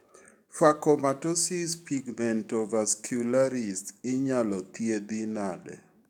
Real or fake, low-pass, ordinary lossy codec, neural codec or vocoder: fake; 19.8 kHz; none; codec, 44.1 kHz, 7.8 kbps, DAC